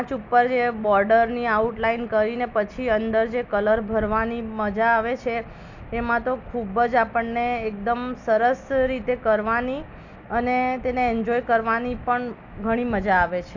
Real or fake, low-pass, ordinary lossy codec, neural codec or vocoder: real; 7.2 kHz; none; none